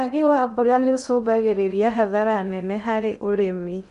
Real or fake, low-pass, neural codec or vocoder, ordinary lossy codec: fake; 10.8 kHz; codec, 16 kHz in and 24 kHz out, 0.6 kbps, FocalCodec, streaming, 2048 codes; none